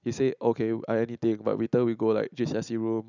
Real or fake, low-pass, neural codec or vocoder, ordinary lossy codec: real; 7.2 kHz; none; none